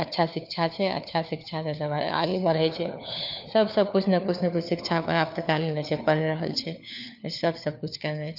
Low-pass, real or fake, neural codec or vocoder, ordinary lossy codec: 5.4 kHz; fake; codec, 16 kHz, 4 kbps, FunCodec, trained on LibriTTS, 50 frames a second; none